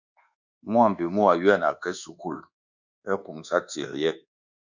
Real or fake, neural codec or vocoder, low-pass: fake; codec, 24 kHz, 1.2 kbps, DualCodec; 7.2 kHz